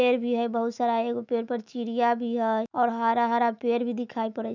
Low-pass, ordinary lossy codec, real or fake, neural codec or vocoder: 7.2 kHz; none; fake; autoencoder, 48 kHz, 128 numbers a frame, DAC-VAE, trained on Japanese speech